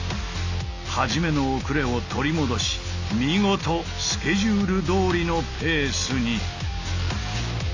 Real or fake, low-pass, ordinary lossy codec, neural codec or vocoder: real; 7.2 kHz; AAC, 48 kbps; none